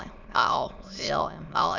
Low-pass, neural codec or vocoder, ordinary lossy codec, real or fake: 7.2 kHz; autoencoder, 22.05 kHz, a latent of 192 numbers a frame, VITS, trained on many speakers; none; fake